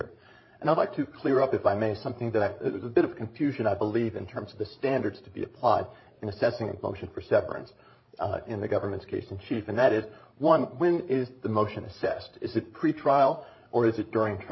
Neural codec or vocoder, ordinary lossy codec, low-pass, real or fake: codec, 16 kHz, 16 kbps, FreqCodec, larger model; MP3, 24 kbps; 7.2 kHz; fake